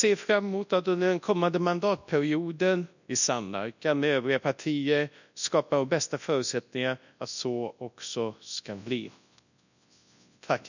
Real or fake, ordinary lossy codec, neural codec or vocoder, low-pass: fake; none; codec, 24 kHz, 0.9 kbps, WavTokenizer, large speech release; 7.2 kHz